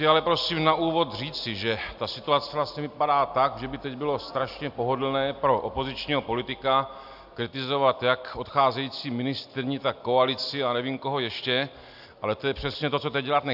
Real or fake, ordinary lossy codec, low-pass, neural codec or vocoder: real; AAC, 48 kbps; 5.4 kHz; none